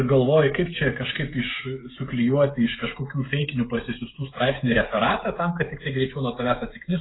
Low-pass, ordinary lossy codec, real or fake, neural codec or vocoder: 7.2 kHz; AAC, 16 kbps; fake; codec, 16 kHz, 16 kbps, FreqCodec, smaller model